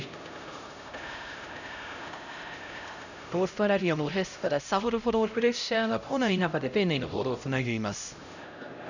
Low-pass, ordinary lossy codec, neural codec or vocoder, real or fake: 7.2 kHz; none; codec, 16 kHz, 0.5 kbps, X-Codec, HuBERT features, trained on LibriSpeech; fake